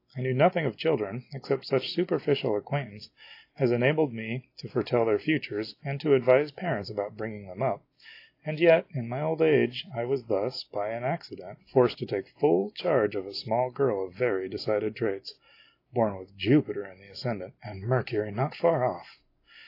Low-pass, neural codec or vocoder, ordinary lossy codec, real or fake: 5.4 kHz; none; AAC, 32 kbps; real